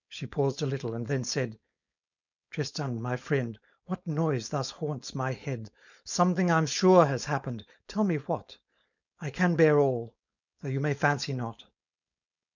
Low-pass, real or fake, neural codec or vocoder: 7.2 kHz; fake; codec, 16 kHz, 4.8 kbps, FACodec